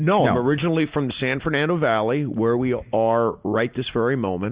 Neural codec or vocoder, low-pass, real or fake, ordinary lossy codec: none; 3.6 kHz; real; Opus, 64 kbps